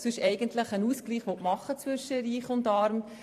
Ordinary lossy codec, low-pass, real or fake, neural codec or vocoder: AAC, 64 kbps; 14.4 kHz; fake; vocoder, 44.1 kHz, 128 mel bands every 512 samples, BigVGAN v2